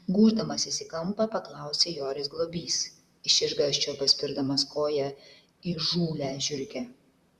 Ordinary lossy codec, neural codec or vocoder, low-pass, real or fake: Opus, 64 kbps; vocoder, 44.1 kHz, 128 mel bands, Pupu-Vocoder; 14.4 kHz; fake